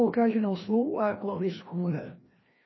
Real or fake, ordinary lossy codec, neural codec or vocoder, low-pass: fake; MP3, 24 kbps; codec, 16 kHz, 1 kbps, FreqCodec, larger model; 7.2 kHz